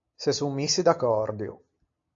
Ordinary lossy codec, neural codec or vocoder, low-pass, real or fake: AAC, 48 kbps; none; 7.2 kHz; real